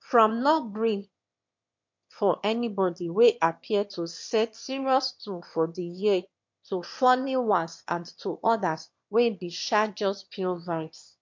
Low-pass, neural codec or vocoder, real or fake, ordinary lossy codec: 7.2 kHz; autoencoder, 22.05 kHz, a latent of 192 numbers a frame, VITS, trained on one speaker; fake; MP3, 48 kbps